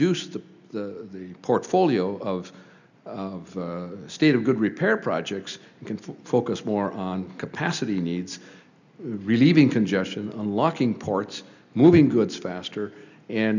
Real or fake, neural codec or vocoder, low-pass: real; none; 7.2 kHz